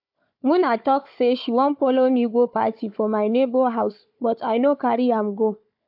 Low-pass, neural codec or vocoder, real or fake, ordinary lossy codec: 5.4 kHz; codec, 16 kHz, 4 kbps, FunCodec, trained on Chinese and English, 50 frames a second; fake; AAC, 48 kbps